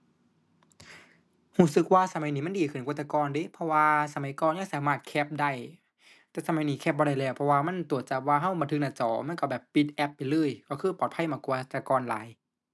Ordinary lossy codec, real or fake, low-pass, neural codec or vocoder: none; real; none; none